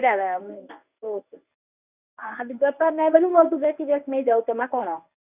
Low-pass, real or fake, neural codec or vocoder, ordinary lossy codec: 3.6 kHz; fake; codec, 24 kHz, 0.9 kbps, WavTokenizer, medium speech release version 1; none